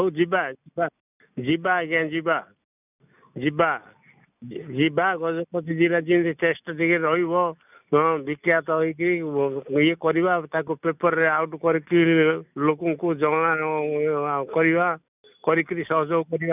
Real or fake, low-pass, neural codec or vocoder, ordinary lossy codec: real; 3.6 kHz; none; none